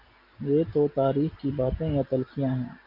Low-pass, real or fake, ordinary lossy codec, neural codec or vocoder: 5.4 kHz; real; MP3, 48 kbps; none